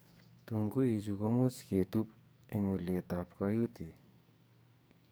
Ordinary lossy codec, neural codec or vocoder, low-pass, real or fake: none; codec, 44.1 kHz, 2.6 kbps, SNAC; none; fake